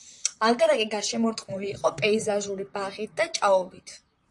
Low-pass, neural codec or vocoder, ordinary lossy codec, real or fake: 10.8 kHz; vocoder, 44.1 kHz, 128 mel bands, Pupu-Vocoder; AAC, 64 kbps; fake